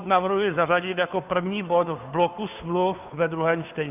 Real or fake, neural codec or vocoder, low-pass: fake; codec, 16 kHz, 2 kbps, FunCodec, trained on Chinese and English, 25 frames a second; 3.6 kHz